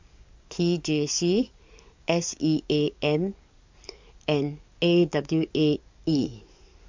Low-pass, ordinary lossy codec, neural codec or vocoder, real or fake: 7.2 kHz; MP3, 64 kbps; codec, 44.1 kHz, 7.8 kbps, DAC; fake